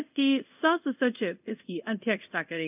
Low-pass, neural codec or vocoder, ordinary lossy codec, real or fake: 3.6 kHz; codec, 24 kHz, 0.5 kbps, DualCodec; none; fake